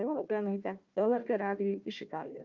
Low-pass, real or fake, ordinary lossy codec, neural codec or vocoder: 7.2 kHz; fake; Opus, 24 kbps; codec, 16 kHz, 1 kbps, FunCodec, trained on Chinese and English, 50 frames a second